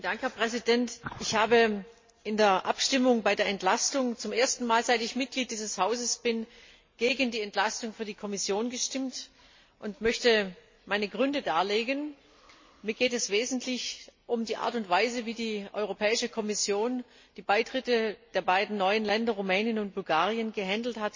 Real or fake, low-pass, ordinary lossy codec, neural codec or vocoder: real; 7.2 kHz; MP3, 32 kbps; none